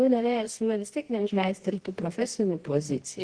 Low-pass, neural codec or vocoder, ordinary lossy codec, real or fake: 9.9 kHz; codec, 24 kHz, 0.9 kbps, WavTokenizer, medium music audio release; Opus, 16 kbps; fake